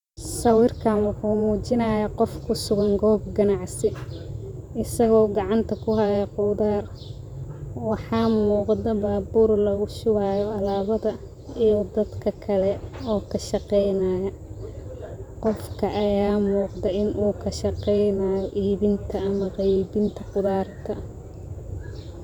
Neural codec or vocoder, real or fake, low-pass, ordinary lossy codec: vocoder, 44.1 kHz, 128 mel bands every 512 samples, BigVGAN v2; fake; 19.8 kHz; none